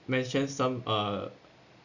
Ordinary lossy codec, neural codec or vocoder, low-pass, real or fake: none; none; 7.2 kHz; real